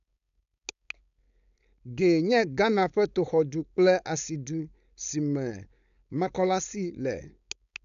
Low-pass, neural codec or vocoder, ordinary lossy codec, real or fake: 7.2 kHz; codec, 16 kHz, 4.8 kbps, FACodec; none; fake